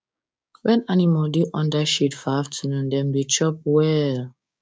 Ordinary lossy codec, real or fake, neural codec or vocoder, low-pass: none; fake; codec, 16 kHz, 6 kbps, DAC; none